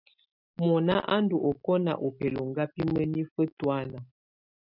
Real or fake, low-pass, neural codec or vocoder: real; 5.4 kHz; none